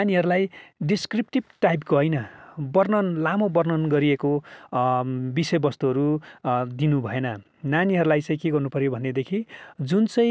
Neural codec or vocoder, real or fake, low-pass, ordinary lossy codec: none; real; none; none